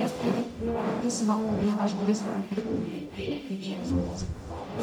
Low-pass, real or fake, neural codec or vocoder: 19.8 kHz; fake; codec, 44.1 kHz, 0.9 kbps, DAC